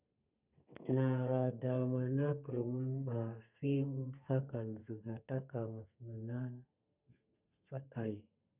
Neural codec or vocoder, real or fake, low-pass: codec, 32 kHz, 1.9 kbps, SNAC; fake; 3.6 kHz